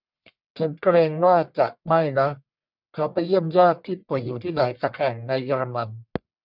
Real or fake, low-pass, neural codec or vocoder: fake; 5.4 kHz; codec, 44.1 kHz, 1.7 kbps, Pupu-Codec